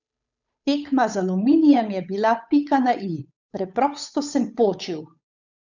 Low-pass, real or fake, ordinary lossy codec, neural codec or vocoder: 7.2 kHz; fake; none; codec, 16 kHz, 8 kbps, FunCodec, trained on Chinese and English, 25 frames a second